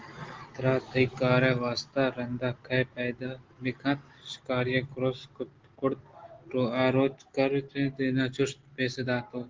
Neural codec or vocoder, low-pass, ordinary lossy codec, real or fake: none; 7.2 kHz; Opus, 16 kbps; real